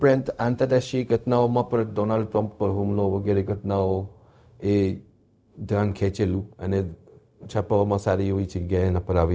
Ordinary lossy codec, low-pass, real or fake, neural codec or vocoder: none; none; fake; codec, 16 kHz, 0.4 kbps, LongCat-Audio-Codec